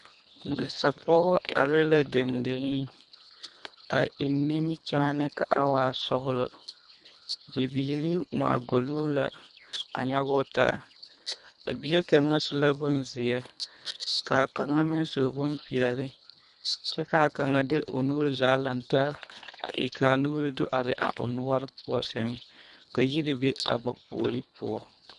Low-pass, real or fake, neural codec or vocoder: 10.8 kHz; fake; codec, 24 kHz, 1.5 kbps, HILCodec